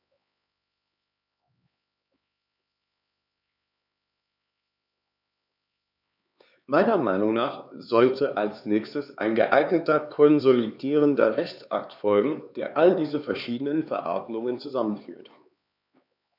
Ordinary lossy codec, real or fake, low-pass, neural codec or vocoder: none; fake; 5.4 kHz; codec, 16 kHz, 4 kbps, X-Codec, HuBERT features, trained on LibriSpeech